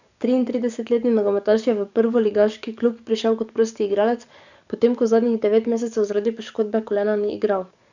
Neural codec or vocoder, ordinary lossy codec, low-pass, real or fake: codec, 44.1 kHz, 7.8 kbps, DAC; none; 7.2 kHz; fake